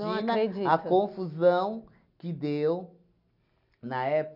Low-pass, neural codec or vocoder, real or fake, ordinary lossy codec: 5.4 kHz; none; real; none